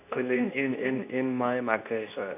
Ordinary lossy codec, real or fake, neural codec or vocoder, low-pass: none; fake; codec, 24 kHz, 0.9 kbps, WavTokenizer, medium speech release version 2; 3.6 kHz